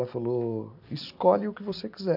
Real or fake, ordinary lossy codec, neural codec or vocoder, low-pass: real; none; none; 5.4 kHz